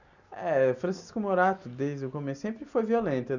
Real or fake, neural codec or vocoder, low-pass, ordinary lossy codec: real; none; 7.2 kHz; none